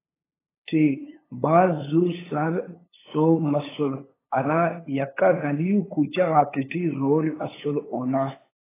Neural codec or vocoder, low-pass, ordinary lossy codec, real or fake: codec, 16 kHz, 8 kbps, FunCodec, trained on LibriTTS, 25 frames a second; 3.6 kHz; AAC, 16 kbps; fake